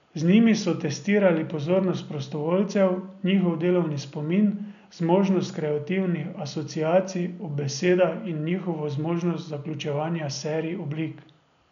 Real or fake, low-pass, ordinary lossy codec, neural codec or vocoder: real; 7.2 kHz; none; none